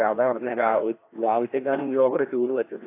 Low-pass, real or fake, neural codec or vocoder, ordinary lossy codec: 3.6 kHz; fake; codec, 16 kHz, 1 kbps, FreqCodec, larger model; AAC, 24 kbps